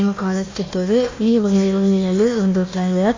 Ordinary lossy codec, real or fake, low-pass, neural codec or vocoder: AAC, 32 kbps; fake; 7.2 kHz; codec, 16 kHz, 1 kbps, FunCodec, trained on Chinese and English, 50 frames a second